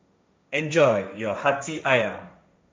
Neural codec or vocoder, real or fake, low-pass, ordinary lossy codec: codec, 16 kHz, 1.1 kbps, Voila-Tokenizer; fake; none; none